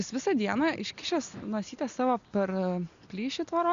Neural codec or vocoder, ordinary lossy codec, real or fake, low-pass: none; Opus, 64 kbps; real; 7.2 kHz